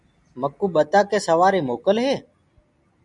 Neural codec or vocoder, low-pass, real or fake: none; 10.8 kHz; real